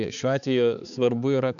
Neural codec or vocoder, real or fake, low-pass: codec, 16 kHz, 4 kbps, X-Codec, HuBERT features, trained on balanced general audio; fake; 7.2 kHz